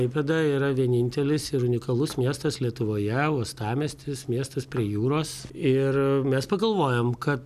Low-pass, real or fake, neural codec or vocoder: 14.4 kHz; real; none